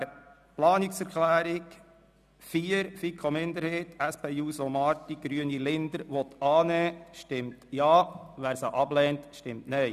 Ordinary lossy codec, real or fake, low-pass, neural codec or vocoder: none; real; 14.4 kHz; none